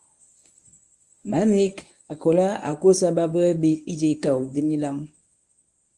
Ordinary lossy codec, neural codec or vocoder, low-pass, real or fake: Opus, 32 kbps; codec, 24 kHz, 0.9 kbps, WavTokenizer, medium speech release version 1; 10.8 kHz; fake